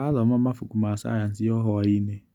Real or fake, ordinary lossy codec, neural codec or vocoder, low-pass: real; none; none; 19.8 kHz